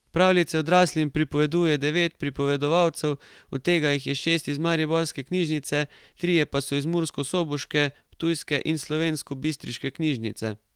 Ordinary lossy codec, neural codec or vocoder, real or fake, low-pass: Opus, 24 kbps; autoencoder, 48 kHz, 128 numbers a frame, DAC-VAE, trained on Japanese speech; fake; 19.8 kHz